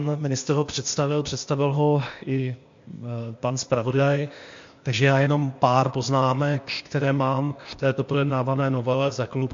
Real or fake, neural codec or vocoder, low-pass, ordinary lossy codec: fake; codec, 16 kHz, 0.8 kbps, ZipCodec; 7.2 kHz; MP3, 48 kbps